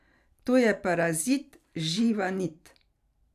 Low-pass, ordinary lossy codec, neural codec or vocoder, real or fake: 14.4 kHz; none; vocoder, 44.1 kHz, 128 mel bands every 512 samples, BigVGAN v2; fake